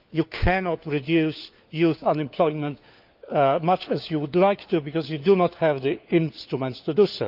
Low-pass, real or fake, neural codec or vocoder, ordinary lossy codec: 5.4 kHz; fake; codec, 16 kHz, 4 kbps, FunCodec, trained on LibriTTS, 50 frames a second; Opus, 24 kbps